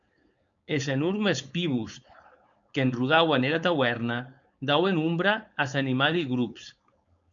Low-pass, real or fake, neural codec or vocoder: 7.2 kHz; fake; codec, 16 kHz, 4.8 kbps, FACodec